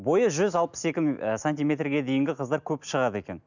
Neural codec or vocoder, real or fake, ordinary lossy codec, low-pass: none; real; none; 7.2 kHz